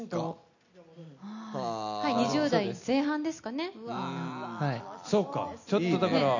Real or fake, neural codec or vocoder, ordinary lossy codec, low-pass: real; none; none; 7.2 kHz